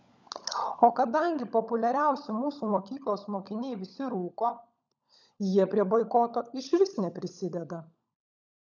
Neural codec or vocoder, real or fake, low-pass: codec, 16 kHz, 16 kbps, FunCodec, trained on LibriTTS, 50 frames a second; fake; 7.2 kHz